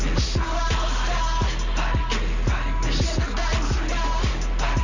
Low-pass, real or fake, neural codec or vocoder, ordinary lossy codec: 7.2 kHz; real; none; Opus, 64 kbps